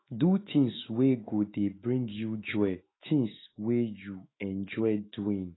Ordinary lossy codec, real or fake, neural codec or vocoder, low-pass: AAC, 16 kbps; fake; autoencoder, 48 kHz, 128 numbers a frame, DAC-VAE, trained on Japanese speech; 7.2 kHz